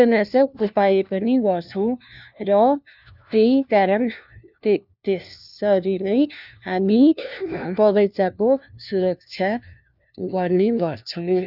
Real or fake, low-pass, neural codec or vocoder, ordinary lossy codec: fake; 5.4 kHz; codec, 16 kHz, 1 kbps, FunCodec, trained on LibriTTS, 50 frames a second; none